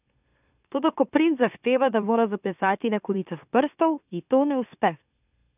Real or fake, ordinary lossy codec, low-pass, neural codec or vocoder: fake; none; 3.6 kHz; autoencoder, 44.1 kHz, a latent of 192 numbers a frame, MeloTTS